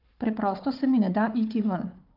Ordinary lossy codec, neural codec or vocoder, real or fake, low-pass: Opus, 32 kbps; codec, 16 kHz, 4 kbps, FunCodec, trained on Chinese and English, 50 frames a second; fake; 5.4 kHz